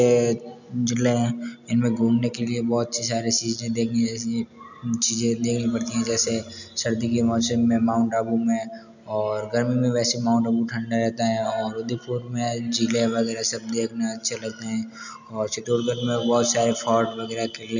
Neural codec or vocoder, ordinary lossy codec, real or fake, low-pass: none; none; real; 7.2 kHz